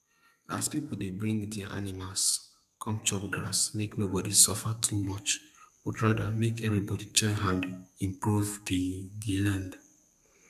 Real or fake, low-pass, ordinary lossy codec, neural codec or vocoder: fake; 14.4 kHz; none; codec, 32 kHz, 1.9 kbps, SNAC